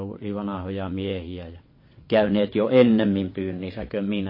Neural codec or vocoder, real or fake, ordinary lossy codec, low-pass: vocoder, 22.05 kHz, 80 mel bands, WaveNeXt; fake; MP3, 24 kbps; 5.4 kHz